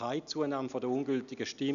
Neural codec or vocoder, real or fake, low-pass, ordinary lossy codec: none; real; 7.2 kHz; none